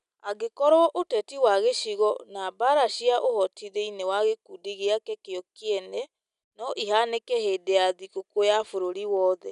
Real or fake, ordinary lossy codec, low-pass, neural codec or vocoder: real; none; 10.8 kHz; none